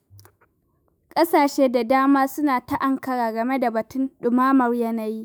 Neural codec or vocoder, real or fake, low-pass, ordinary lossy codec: autoencoder, 48 kHz, 128 numbers a frame, DAC-VAE, trained on Japanese speech; fake; none; none